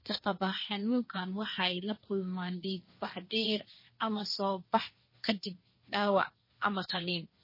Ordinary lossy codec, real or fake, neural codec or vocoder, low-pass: MP3, 24 kbps; fake; codec, 16 kHz, 1.1 kbps, Voila-Tokenizer; 5.4 kHz